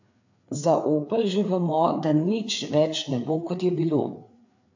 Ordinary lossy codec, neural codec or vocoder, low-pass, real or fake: AAC, 48 kbps; codec, 16 kHz, 4 kbps, FreqCodec, larger model; 7.2 kHz; fake